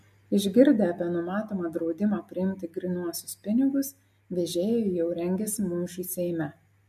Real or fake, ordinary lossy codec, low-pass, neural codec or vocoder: real; MP3, 64 kbps; 14.4 kHz; none